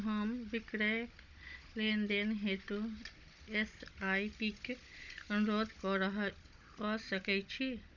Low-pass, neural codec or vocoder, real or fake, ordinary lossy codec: 7.2 kHz; codec, 16 kHz, 16 kbps, FunCodec, trained on Chinese and English, 50 frames a second; fake; none